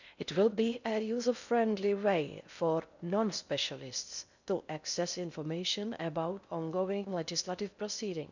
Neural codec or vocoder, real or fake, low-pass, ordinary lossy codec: codec, 16 kHz in and 24 kHz out, 0.6 kbps, FocalCodec, streaming, 4096 codes; fake; 7.2 kHz; none